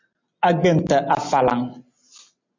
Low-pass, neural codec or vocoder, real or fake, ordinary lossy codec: 7.2 kHz; none; real; MP3, 48 kbps